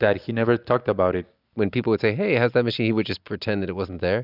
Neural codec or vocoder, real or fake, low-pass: none; real; 5.4 kHz